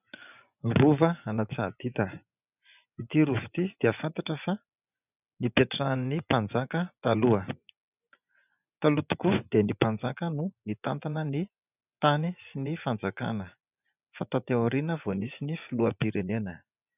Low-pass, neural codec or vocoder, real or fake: 3.6 kHz; vocoder, 24 kHz, 100 mel bands, Vocos; fake